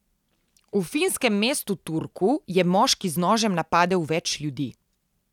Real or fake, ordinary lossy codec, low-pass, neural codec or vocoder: real; none; 19.8 kHz; none